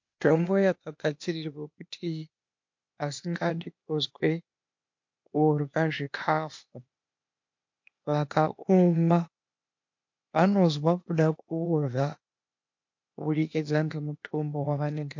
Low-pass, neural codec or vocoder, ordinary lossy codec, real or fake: 7.2 kHz; codec, 16 kHz, 0.8 kbps, ZipCodec; MP3, 48 kbps; fake